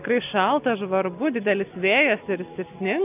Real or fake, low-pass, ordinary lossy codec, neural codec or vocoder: real; 3.6 kHz; AAC, 32 kbps; none